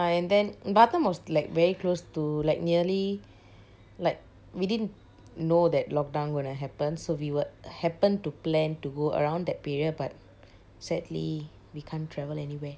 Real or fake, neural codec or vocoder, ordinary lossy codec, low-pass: real; none; none; none